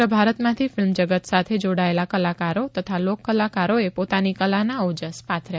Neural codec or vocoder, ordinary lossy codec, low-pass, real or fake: none; none; none; real